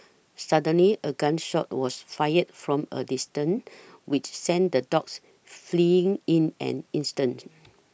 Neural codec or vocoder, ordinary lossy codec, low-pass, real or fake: none; none; none; real